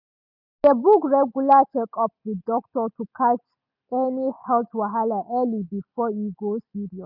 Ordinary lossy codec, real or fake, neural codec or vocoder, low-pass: none; real; none; 5.4 kHz